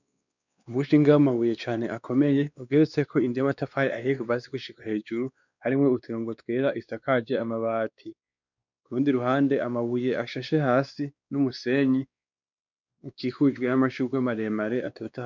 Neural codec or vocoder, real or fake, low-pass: codec, 16 kHz, 2 kbps, X-Codec, WavLM features, trained on Multilingual LibriSpeech; fake; 7.2 kHz